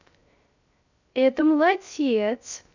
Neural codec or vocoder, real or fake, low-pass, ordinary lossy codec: codec, 16 kHz, 0.3 kbps, FocalCodec; fake; 7.2 kHz; none